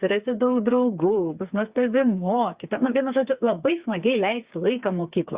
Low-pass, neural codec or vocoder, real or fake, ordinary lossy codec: 3.6 kHz; codec, 16 kHz, 4 kbps, FreqCodec, smaller model; fake; Opus, 64 kbps